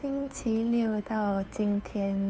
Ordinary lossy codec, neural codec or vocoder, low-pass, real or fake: none; codec, 16 kHz, 2 kbps, FunCodec, trained on Chinese and English, 25 frames a second; none; fake